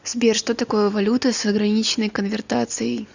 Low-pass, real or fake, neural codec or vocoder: 7.2 kHz; real; none